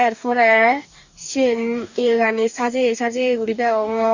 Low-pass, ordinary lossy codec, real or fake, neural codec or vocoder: 7.2 kHz; none; fake; codec, 44.1 kHz, 2.6 kbps, DAC